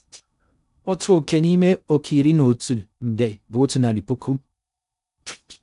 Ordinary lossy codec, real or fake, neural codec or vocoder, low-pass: none; fake; codec, 16 kHz in and 24 kHz out, 0.6 kbps, FocalCodec, streaming, 2048 codes; 10.8 kHz